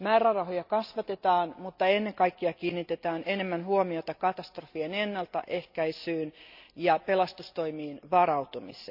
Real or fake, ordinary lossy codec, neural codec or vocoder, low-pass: real; none; none; 5.4 kHz